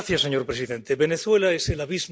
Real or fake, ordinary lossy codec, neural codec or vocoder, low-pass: real; none; none; none